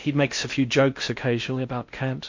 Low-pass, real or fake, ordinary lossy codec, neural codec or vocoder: 7.2 kHz; fake; MP3, 48 kbps; codec, 16 kHz in and 24 kHz out, 0.6 kbps, FocalCodec, streaming, 4096 codes